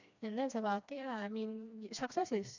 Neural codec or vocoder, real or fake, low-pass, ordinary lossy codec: codec, 16 kHz, 2 kbps, FreqCodec, smaller model; fake; 7.2 kHz; none